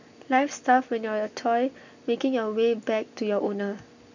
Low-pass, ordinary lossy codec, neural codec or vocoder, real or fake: 7.2 kHz; none; none; real